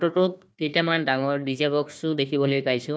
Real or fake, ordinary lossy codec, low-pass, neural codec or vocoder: fake; none; none; codec, 16 kHz, 1 kbps, FunCodec, trained on Chinese and English, 50 frames a second